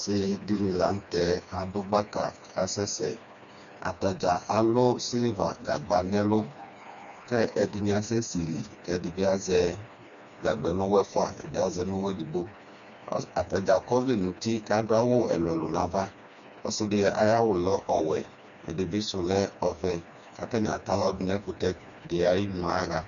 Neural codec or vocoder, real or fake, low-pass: codec, 16 kHz, 2 kbps, FreqCodec, smaller model; fake; 7.2 kHz